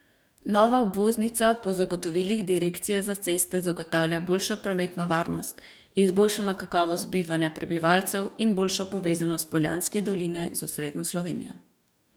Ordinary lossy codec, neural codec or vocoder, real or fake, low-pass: none; codec, 44.1 kHz, 2.6 kbps, DAC; fake; none